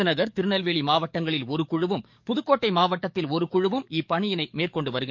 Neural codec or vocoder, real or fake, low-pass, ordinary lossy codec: codec, 44.1 kHz, 7.8 kbps, DAC; fake; 7.2 kHz; MP3, 48 kbps